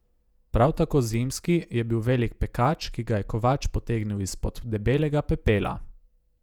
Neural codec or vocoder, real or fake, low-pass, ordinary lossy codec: vocoder, 48 kHz, 128 mel bands, Vocos; fake; 19.8 kHz; none